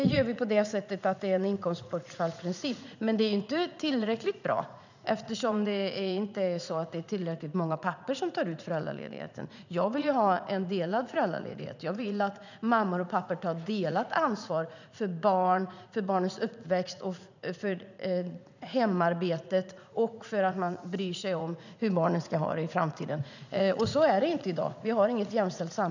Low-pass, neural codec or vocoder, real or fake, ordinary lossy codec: 7.2 kHz; vocoder, 44.1 kHz, 80 mel bands, Vocos; fake; none